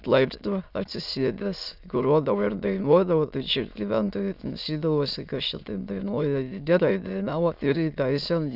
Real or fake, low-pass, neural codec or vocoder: fake; 5.4 kHz; autoencoder, 22.05 kHz, a latent of 192 numbers a frame, VITS, trained on many speakers